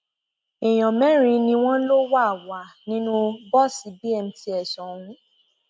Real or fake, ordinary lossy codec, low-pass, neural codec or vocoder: real; none; none; none